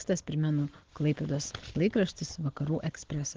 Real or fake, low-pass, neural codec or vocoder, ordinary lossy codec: real; 7.2 kHz; none; Opus, 16 kbps